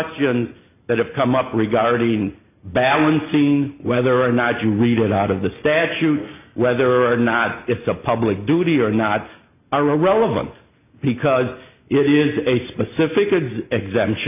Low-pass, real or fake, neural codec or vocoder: 3.6 kHz; real; none